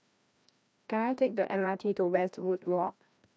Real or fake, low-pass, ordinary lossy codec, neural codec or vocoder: fake; none; none; codec, 16 kHz, 1 kbps, FreqCodec, larger model